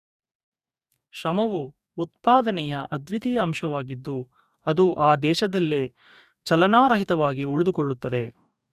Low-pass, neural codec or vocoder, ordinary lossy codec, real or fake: 14.4 kHz; codec, 44.1 kHz, 2.6 kbps, DAC; none; fake